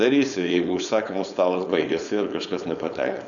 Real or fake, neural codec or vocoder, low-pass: fake; codec, 16 kHz, 4.8 kbps, FACodec; 7.2 kHz